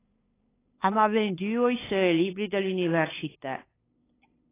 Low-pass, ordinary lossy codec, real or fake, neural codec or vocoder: 3.6 kHz; AAC, 16 kbps; fake; codec, 16 kHz, 8 kbps, FunCodec, trained on LibriTTS, 25 frames a second